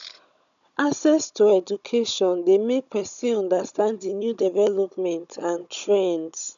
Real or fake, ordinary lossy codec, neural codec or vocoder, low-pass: fake; none; codec, 16 kHz, 16 kbps, FunCodec, trained on Chinese and English, 50 frames a second; 7.2 kHz